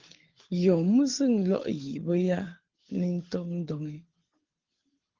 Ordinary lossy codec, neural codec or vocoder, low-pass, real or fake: Opus, 16 kbps; codec, 24 kHz, 6 kbps, HILCodec; 7.2 kHz; fake